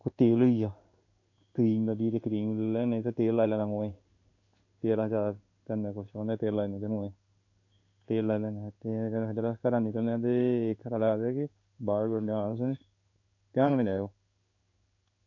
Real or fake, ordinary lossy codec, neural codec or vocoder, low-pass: fake; AAC, 48 kbps; codec, 16 kHz in and 24 kHz out, 1 kbps, XY-Tokenizer; 7.2 kHz